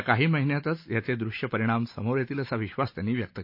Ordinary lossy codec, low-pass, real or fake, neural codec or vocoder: MP3, 32 kbps; 5.4 kHz; real; none